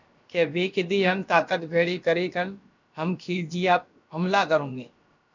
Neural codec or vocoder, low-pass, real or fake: codec, 16 kHz, about 1 kbps, DyCAST, with the encoder's durations; 7.2 kHz; fake